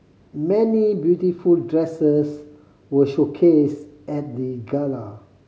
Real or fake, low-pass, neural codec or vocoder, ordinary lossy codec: real; none; none; none